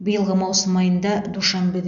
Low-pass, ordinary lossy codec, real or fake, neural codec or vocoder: 7.2 kHz; none; real; none